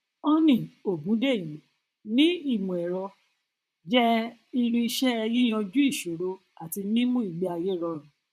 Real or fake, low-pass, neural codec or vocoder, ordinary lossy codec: fake; 14.4 kHz; vocoder, 44.1 kHz, 128 mel bands, Pupu-Vocoder; none